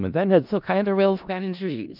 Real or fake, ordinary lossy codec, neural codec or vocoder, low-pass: fake; none; codec, 16 kHz in and 24 kHz out, 0.4 kbps, LongCat-Audio-Codec, four codebook decoder; 5.4 kHz